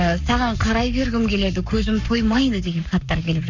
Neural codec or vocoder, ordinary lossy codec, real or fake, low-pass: codec, 44.1 kHz, 7.8 kbps, Pupu-Codec; none; fake; 7.2 kHz